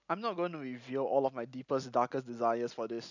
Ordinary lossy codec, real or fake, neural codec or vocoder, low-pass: none; real; none; 7.2 kHz